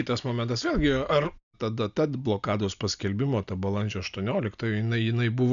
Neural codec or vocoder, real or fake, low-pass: none; real; 7.2 kHz